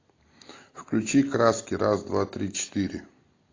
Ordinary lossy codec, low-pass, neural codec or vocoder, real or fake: AAC, 32 kbps; 7.2 kHz; none; real